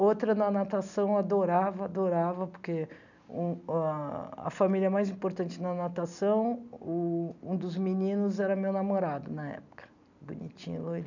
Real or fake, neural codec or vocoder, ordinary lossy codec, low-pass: real; none; none; 7.2 kHz